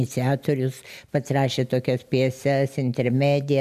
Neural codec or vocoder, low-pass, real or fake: none; 14.4 kHz; real